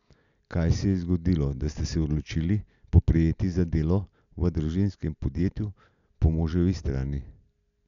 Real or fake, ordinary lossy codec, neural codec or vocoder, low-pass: real; none; none; 7.2 kHz